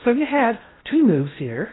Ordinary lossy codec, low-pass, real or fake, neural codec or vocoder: AAC, 16 kbps; 7.2 kHz; fake; codec, 16 kHz in and 24 kHz out, 0.6 kbps, FocalCodec, streaming, 2048 codes